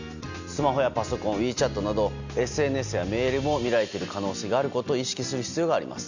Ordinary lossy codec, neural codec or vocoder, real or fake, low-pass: none; none; real; 7.2 kHz